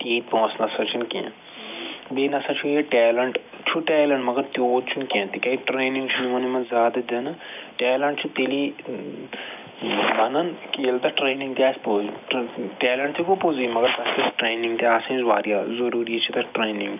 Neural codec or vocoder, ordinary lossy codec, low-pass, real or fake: none; none; 3.6 kHz; real